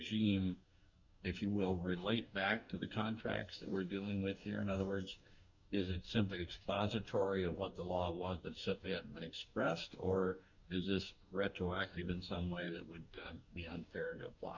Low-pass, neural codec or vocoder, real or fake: 7.2 kHz; codec, 44.1 kHz, 2.6 kbps, DAC; fake